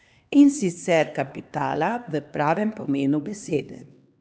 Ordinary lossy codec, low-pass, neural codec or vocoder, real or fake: none; none; codec, 16 kHz, 2 kbps, X-Codec, HuBERT features, trained on LibriSpeech; fake